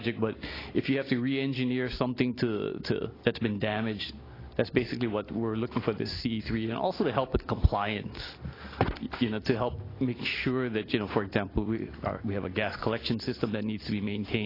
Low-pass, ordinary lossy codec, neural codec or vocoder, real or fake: 5.4 kHz; AAC, 24 kbps; none; real